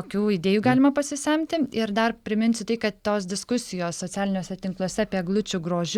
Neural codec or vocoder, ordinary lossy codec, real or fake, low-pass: none; Opus, 64 kbps; real; 19.8 kHz